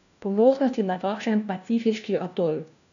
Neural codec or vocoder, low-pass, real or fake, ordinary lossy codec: codec, 16 kHz, 1 kbps, FunCodec, trained on LibriTTS, 50 frames a second; 7.2 kHz; fake; none